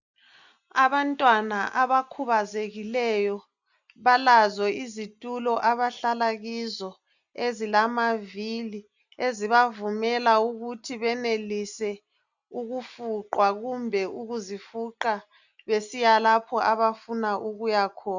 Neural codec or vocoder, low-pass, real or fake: none; 7.2 kHz; real